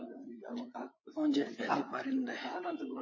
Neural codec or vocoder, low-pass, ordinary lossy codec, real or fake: codec, 16 kHz, 8 kbps, FreqCodec, larger model; 7.2 kHz; MP3, 32 kbps; fake